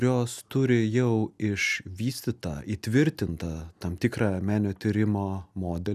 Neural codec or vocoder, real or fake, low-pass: none; real; 14.4 kHz